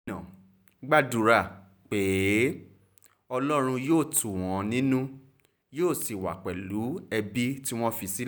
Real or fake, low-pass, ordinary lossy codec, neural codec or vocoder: real; none; none; none